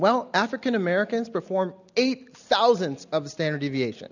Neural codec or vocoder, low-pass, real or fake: none; 7.2 kHz; real